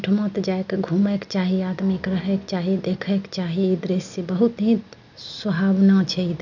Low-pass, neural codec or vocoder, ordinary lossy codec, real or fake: 7.2 kHz; none; none; real